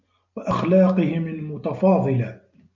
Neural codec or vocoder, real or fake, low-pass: none; real; 7.2 kHz